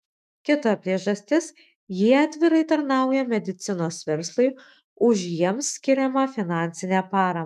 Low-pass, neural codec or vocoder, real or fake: 14.4 kHz; autoencoder, 48 kHz, 128 numbers a frame, DAC-VAE, trained on Japanese speech; fake